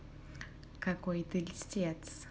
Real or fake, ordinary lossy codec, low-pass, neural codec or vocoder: real; none; none; none